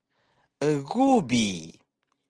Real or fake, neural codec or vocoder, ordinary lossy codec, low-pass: real; none; Opus, 16 kbps; 9.9 kHz